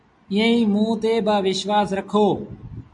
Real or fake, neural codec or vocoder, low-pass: real; none; 10.8 kHz